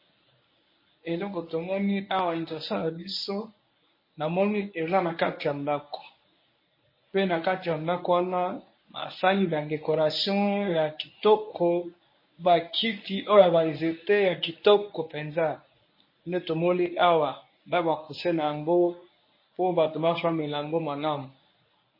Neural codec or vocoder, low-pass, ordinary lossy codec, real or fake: codec, 24 kHz, 0.9 kbps, WavTokenizer, medium speech release version 1; 5.4 kHz; MP3, 24 kbps; fake